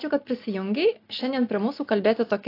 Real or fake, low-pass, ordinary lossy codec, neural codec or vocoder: real; 5.4 kHz; AAC, 32 kbps; none